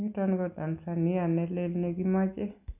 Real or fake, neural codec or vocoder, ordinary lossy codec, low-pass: real; none; none; 3.6 kHz